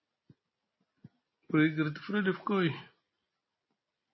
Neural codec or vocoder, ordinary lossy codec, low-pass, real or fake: none; MP3, 24 kbps; 7.2 kHz; real